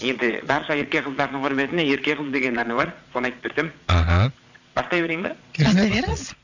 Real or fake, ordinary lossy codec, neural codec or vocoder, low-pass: fake; none; vocoder, 22.05 kHz, 80 mel bands, Vocos; 7.2 kHz